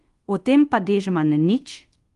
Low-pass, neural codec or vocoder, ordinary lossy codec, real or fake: 10.8 kHz; codec, 24 kHz, 0.5 kbps, DualCodec; Opus, 24 kbps; fake